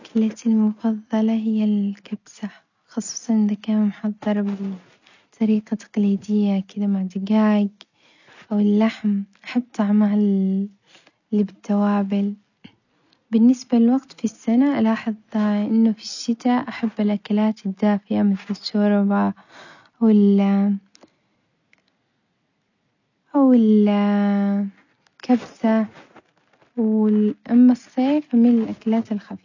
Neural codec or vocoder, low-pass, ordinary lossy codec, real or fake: none; 7.2 kHz; none; real